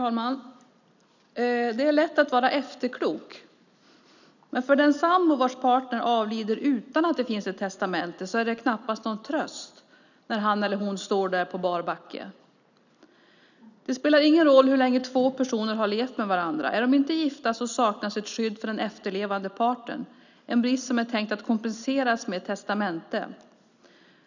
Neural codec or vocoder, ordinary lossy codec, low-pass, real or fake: none; none; 7.2 kHz; real